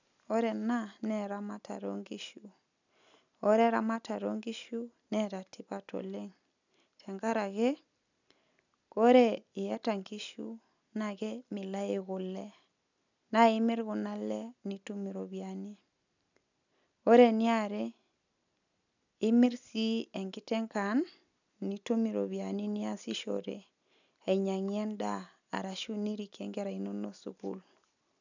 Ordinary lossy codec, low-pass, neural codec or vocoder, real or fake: none; 7.2 kHz; none; real